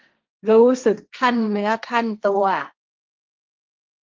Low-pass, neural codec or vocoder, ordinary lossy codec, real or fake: 7.2 kHz; codec, 16 kHz, 1.1 kbps, Voila-Tokenizer; Opus, 32 kbps; fake